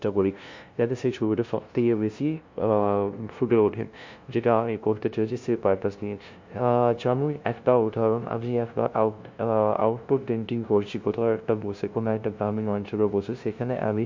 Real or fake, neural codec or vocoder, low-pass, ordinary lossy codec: fake; codec, 16 kHz, 0.5 kbps, FunCodec, trained on LibriTTS, 25 frames a second; 7.2 kHz; MP3, 48 kbps